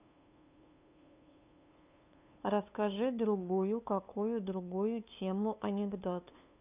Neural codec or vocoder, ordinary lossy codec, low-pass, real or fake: codec, 16 kHz, 2 kbps, FunCodec, trained on LibriTTS, 25 frames a second; none; 3.6 kHz; fake